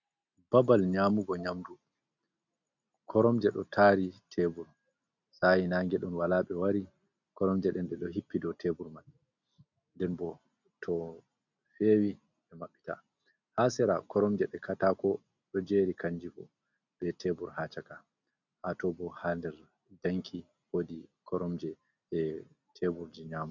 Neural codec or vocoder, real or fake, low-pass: none; real; 7.2 kHz